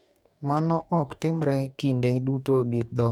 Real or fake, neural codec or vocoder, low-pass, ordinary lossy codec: fake; codec, 44.1 kHz, 2.6 kbps, DAC; 19.8 kHz; none